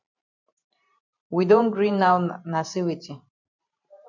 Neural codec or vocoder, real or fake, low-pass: vocoder, 44.1 kHz, 128 mel bands every 512 samples, BigVGAN v2; fake; 7.2 kHz